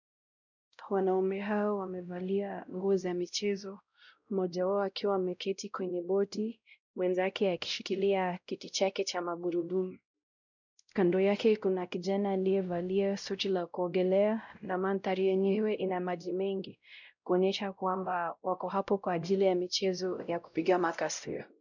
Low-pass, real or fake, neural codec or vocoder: 7.2 kHz; fake; codec, 16 kHz, 0.5 kbps, X-Codec, WavLM features, trained on Multilingual LibriSpeech